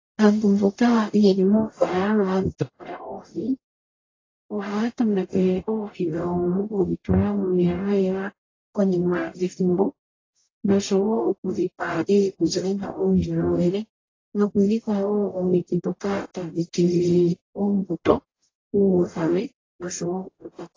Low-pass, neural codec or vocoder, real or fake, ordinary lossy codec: 7.2 kHz; codec, 44.1 kHz, 0.9 kbps, DAC; fake; AAC, 32 kbps